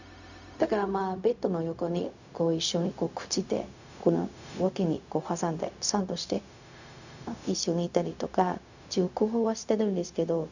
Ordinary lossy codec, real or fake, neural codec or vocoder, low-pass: none; fake; codec, 16 kHz, 0.4 kbps, LongCat-Audio-Codec; 7.2 kHz